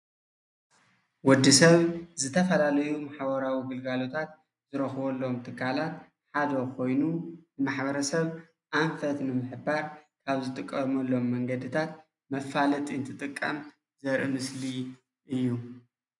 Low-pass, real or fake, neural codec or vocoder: 10.8 kHz; real; none